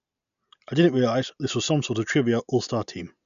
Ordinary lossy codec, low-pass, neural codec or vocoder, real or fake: none; 7.2 kHz; none; real